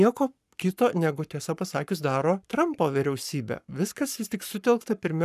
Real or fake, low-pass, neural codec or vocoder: fake; 14.4 kHz; codec, 44.1 kHz, 7.8 kbps, Pupu-Codec